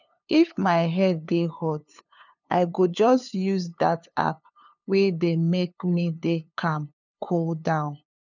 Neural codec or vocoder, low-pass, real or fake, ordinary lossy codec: codec, 16 kHz, 2 kbps, FunCodec, trained on LibriTTS, 25 frames a second; 7.2 kHz; fake; none